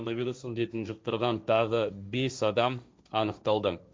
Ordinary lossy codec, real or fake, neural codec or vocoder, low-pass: none; fake; codec, 16 kHz, 1.1 kbps, Voila-Tokenizer; none